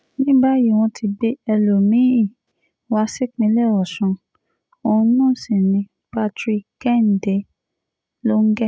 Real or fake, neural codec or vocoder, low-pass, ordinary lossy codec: real; none; none; none